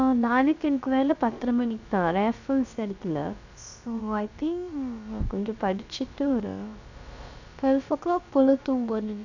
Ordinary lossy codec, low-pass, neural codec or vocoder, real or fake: none; 7.2 kHz; codec, 16 kHz, about 1 kbps, DyCAST, with the encoder's durations; fake